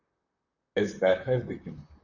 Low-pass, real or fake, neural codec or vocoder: 7.2 kHz; fake; vocoder, 44.1 kHz, 128 mel bands, Pupu-Vocoder